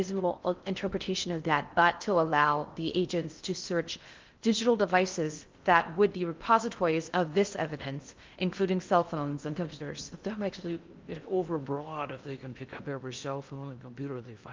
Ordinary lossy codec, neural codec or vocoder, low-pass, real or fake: Opus, 24 kbps; codec, 16 kHz in and 24 kHz out, 0.6 kbps, FocalCodec, streaming, 4096 codes; 7.2 kHz; fake